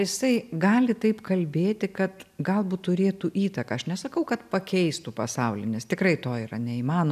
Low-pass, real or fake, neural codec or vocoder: 14.4 kHz; real; none